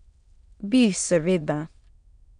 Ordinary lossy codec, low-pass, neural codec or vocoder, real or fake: none; 9.9 kHz; autoencoder, 22.05 kHz, a latent of 192 numbers a frame, VITS, trained on many speakers; fake